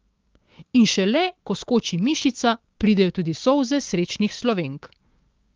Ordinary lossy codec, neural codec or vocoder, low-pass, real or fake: Opus, 32 kbps; codec, 16 kHz, 6 kbps, DAC; 7.2 kHz; fake